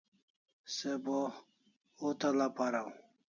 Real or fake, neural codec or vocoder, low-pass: real; none; 7.2 kHz